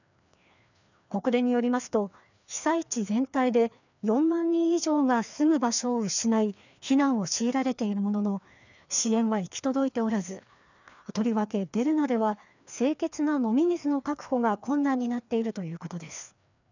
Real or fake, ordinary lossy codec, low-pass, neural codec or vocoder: fake; none; 7.2 kHz; codec, 16 kHz, 2 kbps, FreqCodec, larger model